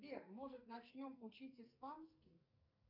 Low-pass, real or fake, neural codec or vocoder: 5.4 kHz; fake; codec, 44.1 kHz, 2.6 kbps, SNAC